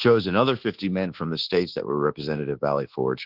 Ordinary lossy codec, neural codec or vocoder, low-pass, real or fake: Opus, 16 kbps; codec, 24 kHz, 1.2 kbps, DualCodec; 5.4 kHz; fake